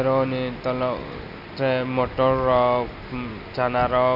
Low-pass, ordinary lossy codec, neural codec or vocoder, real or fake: 5.4 kHz; none; none; real